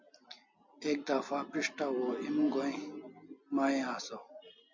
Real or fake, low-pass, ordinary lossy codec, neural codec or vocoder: real; 7.2 kHz; MP3, 48 kbps; none